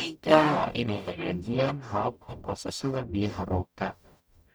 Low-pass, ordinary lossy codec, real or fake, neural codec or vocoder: none; none; fake; codec, 44.1 kHz, 0.9 kbps, DAC